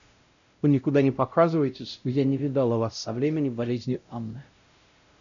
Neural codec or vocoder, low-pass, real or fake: codec, 16 kHz, 0.5 kbps, X-Codec, WavLM features, trained on Multilingual LibriSpeech; 7.2 kHz; fake